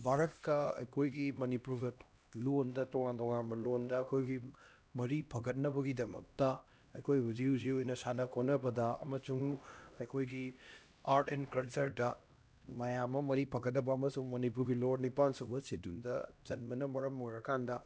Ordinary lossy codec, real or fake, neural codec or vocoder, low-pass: none; fake; codec, 16 kHz, 1 kbps, X-Codec, HuBERT features, trained on LibriSpeech; none